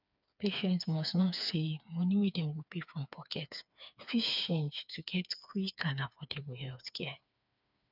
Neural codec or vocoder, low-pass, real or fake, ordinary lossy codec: codec, 16 kHz, 4 kbps, FreqCodec, smaller model; 5.4 kHz; fake; none